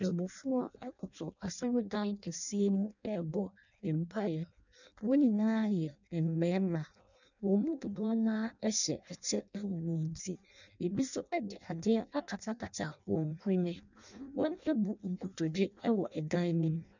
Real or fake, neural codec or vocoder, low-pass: fake; codec, 16 kHz in and 24 kHz out, 0.6 kbps, FireRedTTS-2 codec; 7.2 kHz